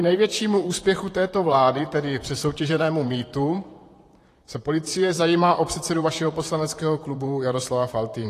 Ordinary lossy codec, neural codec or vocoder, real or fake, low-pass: AAC, 48 kbps; vocoder, 44.1 kHz, 128 mel bands, Pupu-Vocoder; fake; 14.4 kHz